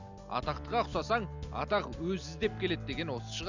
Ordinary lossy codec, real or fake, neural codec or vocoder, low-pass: Opus, 64 kbps; real; none; 7.2 kHz